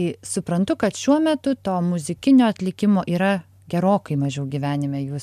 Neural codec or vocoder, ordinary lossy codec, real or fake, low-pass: none; MP3, 96 kbps; real; 14.4 kHz